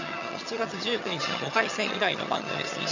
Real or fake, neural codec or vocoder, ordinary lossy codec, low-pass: fake; vocoder, 22.05 kHz, 80 mel bands, HiFi-GAN; none; 7.2 kHz